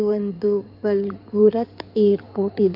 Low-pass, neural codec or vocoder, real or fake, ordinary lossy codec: 5.4 kHz; codec, 16 kHz in and 24 kHz out, 2.2 kbps, FireRedTTS-2 codec; fake; none